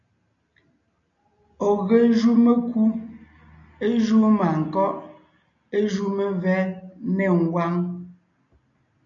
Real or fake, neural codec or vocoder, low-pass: real; none; 7.2 kHz